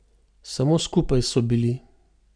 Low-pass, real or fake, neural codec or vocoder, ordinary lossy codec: 9.9 kHz; real; none; none